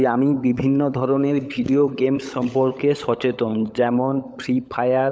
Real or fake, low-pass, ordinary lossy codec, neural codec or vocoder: fake; none; none; codec, 16 kHz, 16 kbps, FunCodec, trained on LibriTTS, 50 frames a second